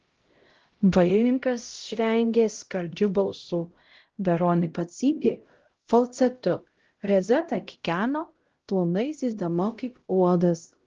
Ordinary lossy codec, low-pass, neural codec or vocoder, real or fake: Opus, 16 kbps; 7.2 kHz; codec, 16 kHz, 0.5 kbps, X-Codec, HuBERT features, trained on LibriSpeech; fake